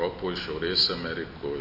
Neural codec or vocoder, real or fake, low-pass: none; real; 5.4 kHz